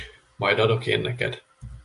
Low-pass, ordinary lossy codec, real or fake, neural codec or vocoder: 10.8 kHz; AAC, 64 kbps; real; none